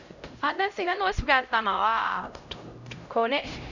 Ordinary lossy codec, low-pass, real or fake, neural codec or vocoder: none; 7.2 kHz; fake; codec, 16 kHz, 0.5 kbps, X-Codec, HuBERT features, trained on LibriSpeech